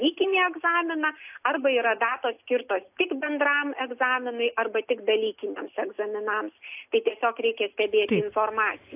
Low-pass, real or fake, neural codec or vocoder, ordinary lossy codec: 3.6 kHz; real; none; AAC, 32 kbps